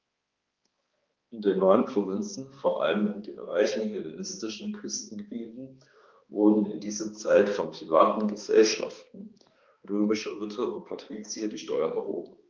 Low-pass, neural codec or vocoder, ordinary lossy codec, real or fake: 7.2 kHz; codec, 16 kHz, 2 kbps, X-Codec, HuBERT features, trained on balanced general audio; Opus, 32 kbps; fake